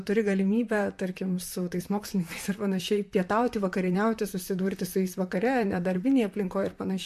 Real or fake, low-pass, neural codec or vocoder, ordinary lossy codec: fake; 14.4 kHz; vocoder, 44.1 kHz, 128 mel bands, Pupu-Vocoder; MP3, 64 kbps